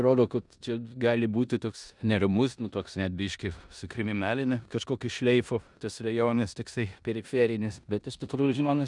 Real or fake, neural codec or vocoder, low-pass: fake; codec, 16 kHz in and 24 kHz out, 0.9 kbps, LongCat-Audio-Codec, four codebook decoder; 10.8 kHz